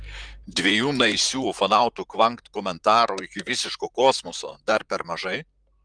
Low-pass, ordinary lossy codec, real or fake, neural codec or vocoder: 9.9 kHz; Opus, 32 kbps; fake; vocoder, 44.1 kHz, 128 mel bands, Pupu-Vocoder